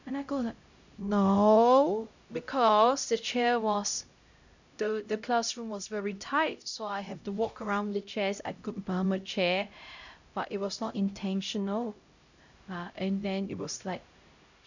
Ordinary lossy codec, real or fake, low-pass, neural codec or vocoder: none; fake; 7.2 kHz; codec, 16 kHz, 0.5 kbps, X-Codec, HuBERT features, trained on LibriSpeech